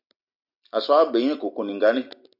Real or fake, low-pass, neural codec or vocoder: real; 5.4 kHz; none